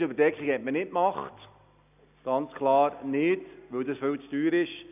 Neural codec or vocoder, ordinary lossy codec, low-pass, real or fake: none; none; 3.6 kHz; real